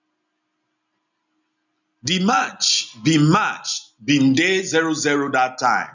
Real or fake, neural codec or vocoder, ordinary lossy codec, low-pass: real; none; none; 7.2 kHz